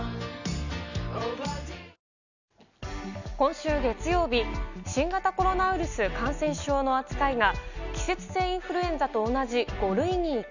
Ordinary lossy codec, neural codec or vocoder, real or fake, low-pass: none; none; real; 7.2 kHz